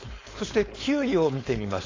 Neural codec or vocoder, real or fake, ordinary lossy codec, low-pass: codec, 16 kHz, 4.8 kbps, FACodec; fake; AAC, 32 kbps; 7.2 kHz